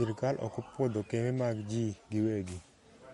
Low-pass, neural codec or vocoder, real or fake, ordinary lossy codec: 19.8 kHz; none; real; MP3, 48 kbps